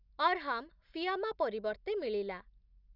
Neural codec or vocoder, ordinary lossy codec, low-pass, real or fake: none; none; 5.4 kHz; real